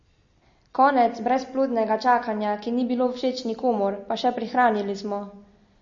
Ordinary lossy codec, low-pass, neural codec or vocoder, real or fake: MP3, 32 kbps; 7.2 kHz; none; real